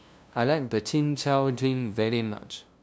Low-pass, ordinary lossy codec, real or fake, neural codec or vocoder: none; none; fake; codec, 16 kHz, 0.5 kbps, FunCodec, trained on LibriTTS, 25 frames a second